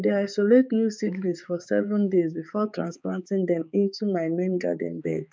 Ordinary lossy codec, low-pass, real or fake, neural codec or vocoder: none; none; fake; codec, 16 kHz, 4 kbps, X-Codec, HuBERT features, trained on balanced general audio